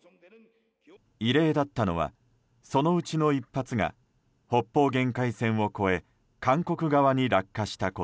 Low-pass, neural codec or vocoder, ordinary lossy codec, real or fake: none; none; none; real